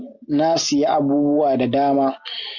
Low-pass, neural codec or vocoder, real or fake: 7.2 kHz; none; real